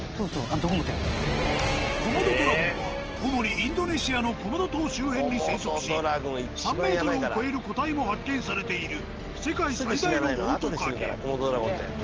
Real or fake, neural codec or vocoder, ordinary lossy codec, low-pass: real; none; Opus, 16 kbps; 7.2 kHz